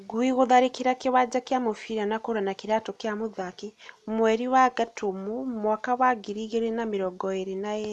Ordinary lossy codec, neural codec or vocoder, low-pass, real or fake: none; none; none; real